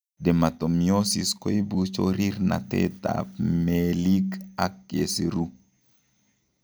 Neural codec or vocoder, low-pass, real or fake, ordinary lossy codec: none; none; real; none